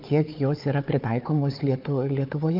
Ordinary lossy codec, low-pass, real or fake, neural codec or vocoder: Opus, 32 kbps; 5.4 kHz; fake; codec, 16 kHz, 4 kbps, FunCodec, trained on Chinese and English, 50 frames a second